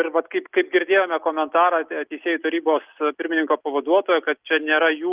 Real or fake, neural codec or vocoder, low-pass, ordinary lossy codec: real; none; 3.6 kHz; Opus, 32 kbps